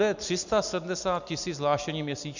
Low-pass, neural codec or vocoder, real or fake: 7.2 kHz; none; real